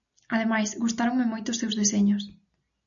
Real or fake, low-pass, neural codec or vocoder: real; 7.2 kHz; none